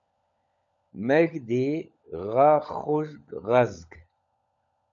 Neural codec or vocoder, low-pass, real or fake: codec, 16 kHz, 16 kbps, FunCodec, trained on LibriTTS, 50 frames a second; 7.2 kHz; fake